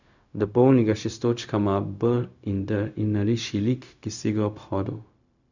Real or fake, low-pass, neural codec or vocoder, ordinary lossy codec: fake; 7.2 kHz; codec, 16 kHz, 0.4 kbps, LongCat-Audio-Codec; none